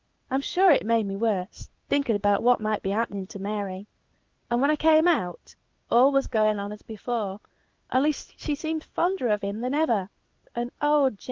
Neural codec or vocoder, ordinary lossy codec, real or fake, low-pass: codec, 16 kHz in and 24 kHz out, 1 kbps, XY-Tokenizer; Opus, 32 kbps; fake; 7.2 kHz